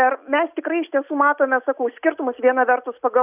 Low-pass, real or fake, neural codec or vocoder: 3.6 kHz; real; none